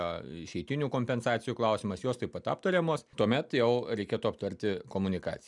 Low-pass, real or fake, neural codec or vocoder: 10.8 kHz; real; none